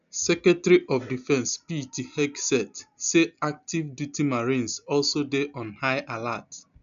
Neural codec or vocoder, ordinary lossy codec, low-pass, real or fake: none; none; 7.2 kHz; real